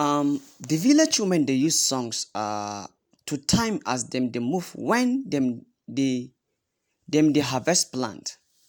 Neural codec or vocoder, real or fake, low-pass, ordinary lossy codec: none; real; none; none